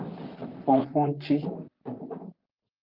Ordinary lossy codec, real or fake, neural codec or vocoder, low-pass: Opus, 24 kbps; fake; vocoder, 44.1 kHz, 128 mel bands, Pupu-Vocoder; 5.4 kHz